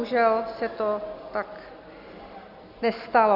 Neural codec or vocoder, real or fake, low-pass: none; real; 5.4 kHz